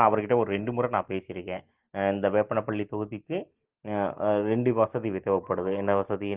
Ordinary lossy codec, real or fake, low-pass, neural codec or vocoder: Opus, 16 kbps; real; 3.6 kHz; none